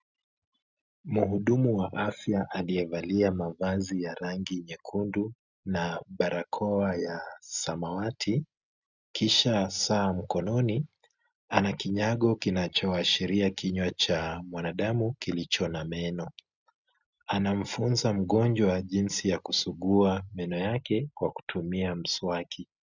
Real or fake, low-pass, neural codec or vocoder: real; 7.2 kHz; none